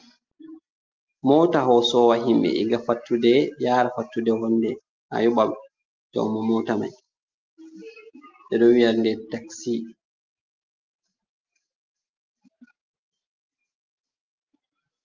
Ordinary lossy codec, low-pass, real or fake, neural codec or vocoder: Opus, 24 kbps; 7.2 kHz; real; none